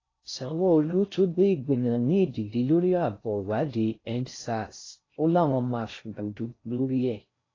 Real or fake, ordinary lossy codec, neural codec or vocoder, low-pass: fake; AAC, 32 kbps; codec, 16 kHz in and 24 kHz out, 0.6 kbps, FocalCodec, streaming, 4096 codes; 7.2 kHz